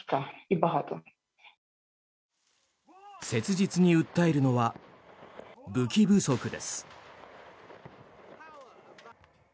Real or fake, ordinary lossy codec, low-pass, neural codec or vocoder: real; none; none; none